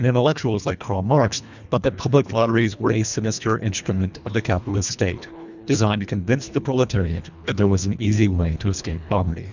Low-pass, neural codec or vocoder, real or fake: 7.2 kHz; codec, 24 kHz, 1.5 kbps, HILCodec; fake